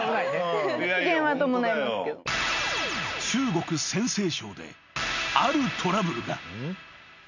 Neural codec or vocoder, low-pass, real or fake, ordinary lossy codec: none; 7.2 kHz; real; none